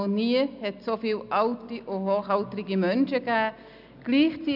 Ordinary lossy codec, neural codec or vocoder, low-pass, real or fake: none; none; 5.4 kHz; real